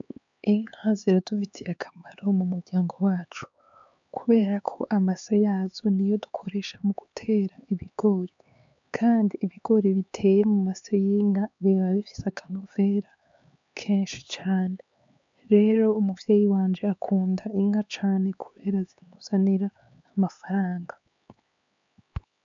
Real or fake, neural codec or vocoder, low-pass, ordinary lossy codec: fake; codec, 16 kHz, 4 kbps, X-Codec, HuBERT features, trained on LibriSpeech; 7.2 kHz; MP3, 64 kbps